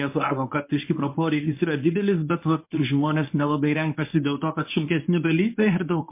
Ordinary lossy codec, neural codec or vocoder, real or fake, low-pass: MP3, 24 kbps; codec, 24 kHz, 0.9 kbps, WavTokenizer, medium speech release version 1; fake; 3.6 kHz